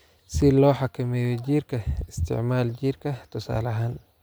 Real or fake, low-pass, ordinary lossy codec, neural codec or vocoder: real; none; none; none